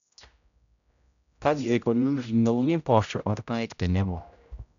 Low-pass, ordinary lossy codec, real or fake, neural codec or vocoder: 7.2 kHz; none; fake; codec, 16 kHz, 0.5 kbps, X-Codec, HuBERT features, trained on general audio